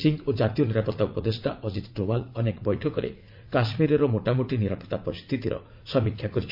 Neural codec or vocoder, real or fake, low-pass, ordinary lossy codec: vocoder, 44.1 kHz, 80 mel bands, Vocos; fake; 5.4 kHz; MP3, 48 kbps